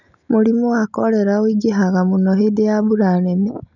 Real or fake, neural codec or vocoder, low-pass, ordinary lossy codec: real; none; 7.2 kHz; none